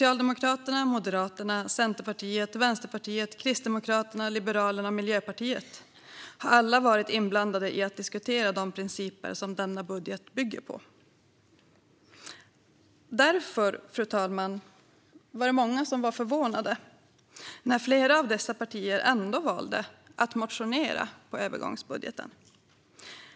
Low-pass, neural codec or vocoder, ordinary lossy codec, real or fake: none; none; none; real